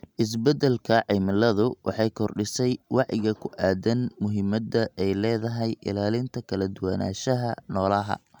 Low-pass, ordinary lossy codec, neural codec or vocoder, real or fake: 19.8 kHz; none; none; real